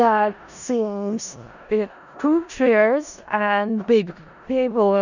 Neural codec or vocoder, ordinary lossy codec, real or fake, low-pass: codec, 16 kHz in and 24 kHz out, 0.4 kbps, LongCat-Audio-Codec, four codebook decoder; none; fake; 7.2 kHz